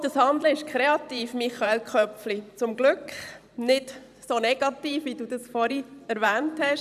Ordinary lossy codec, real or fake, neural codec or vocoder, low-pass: none; fake; vocoder, 44.1 kHz, 128 mel bands every 512 samples, BigVGAN v2; 14.4 kHz